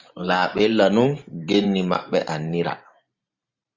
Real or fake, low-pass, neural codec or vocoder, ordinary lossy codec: fake; 7.2 kHz; vocoder, 44.1 kHz, 128 mel bands every 512 samples, BigVGAN v2; Opus, 64 kbps